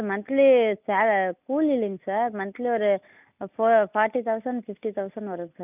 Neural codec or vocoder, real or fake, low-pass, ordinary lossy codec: none; real; 3.6 kHz; none